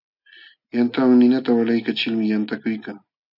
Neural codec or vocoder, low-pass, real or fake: none; 5.4 kHz; real